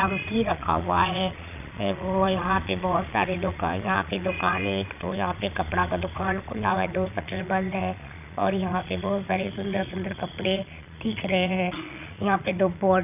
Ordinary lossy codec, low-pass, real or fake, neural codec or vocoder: none; 3.6 kHz; fake; vocoder, 22.05 kHz, 80 mel bands, Vocos